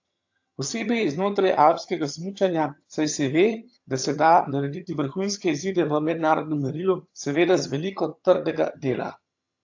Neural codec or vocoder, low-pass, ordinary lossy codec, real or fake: vocoder, 22.05 kHz, 80 mel bands, HiFi-GAN; 7.2 kHz; AAC, 48 kbps; fake